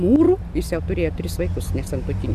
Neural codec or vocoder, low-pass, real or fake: none; 14.4 kHz; real